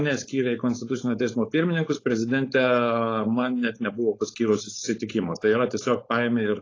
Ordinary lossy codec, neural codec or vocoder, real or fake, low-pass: AAC, 32 kbps; codec, 16 kHz, 4.8 kbps, FACodec; fake; 7.2 kHz